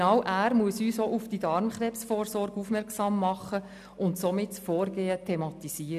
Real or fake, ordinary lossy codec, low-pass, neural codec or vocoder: real; none; 14.4 kHz; none